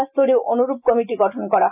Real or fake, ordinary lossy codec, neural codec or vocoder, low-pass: real; none; none; 3.6 kHz